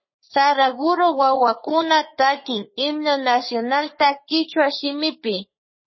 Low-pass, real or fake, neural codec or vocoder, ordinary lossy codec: 7.2 kHz; fake; codec, 44.1 kHz, 3.4 kbps, Pupu-Codec; MP3, 24 kbps